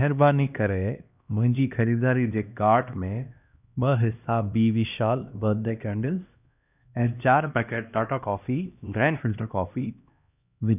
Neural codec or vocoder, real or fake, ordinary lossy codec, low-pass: codec, 16 kHz, 1 kbps, X-Codec, HuBERT features, trained on LibriSpeech; fake; none; 3.6 kHz